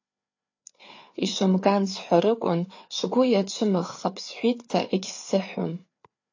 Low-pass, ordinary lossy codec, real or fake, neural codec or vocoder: 7.2 kHz; AAC, 48 kbps; fake; codec, 16 kHz, 4 kbps, FreqCodec, larger model